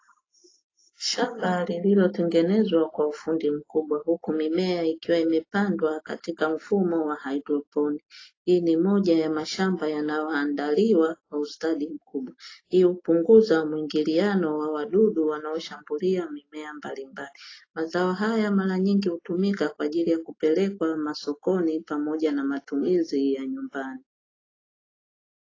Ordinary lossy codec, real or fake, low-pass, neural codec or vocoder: AAC, 32 kbps; real; 7.2 kHz; none